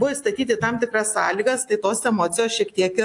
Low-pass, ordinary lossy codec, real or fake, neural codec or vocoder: 10.8 kHz; AAC, 64 kbps; real; none